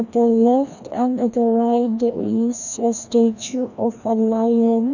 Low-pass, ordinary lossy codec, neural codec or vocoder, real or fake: 7.2 kHz; none; codec, 16 kHz, 1 kbps, FreqCodec, larger model; fake